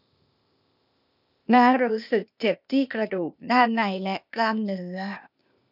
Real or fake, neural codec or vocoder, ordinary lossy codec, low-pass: fake; codec, 16 kHz, 0.8 kbps, ZipCodec; none; 5.4 kHz